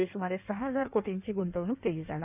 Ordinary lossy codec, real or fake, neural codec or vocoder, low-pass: none; fake; codec, 16 kHz in and 24 kHz out, 1.1 kbps, FireRedTTS-2 codec; 3.6 kHz